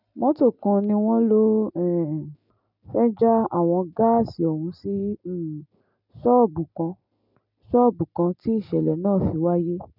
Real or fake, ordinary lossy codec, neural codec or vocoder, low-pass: real; none; none; 5.4 kHz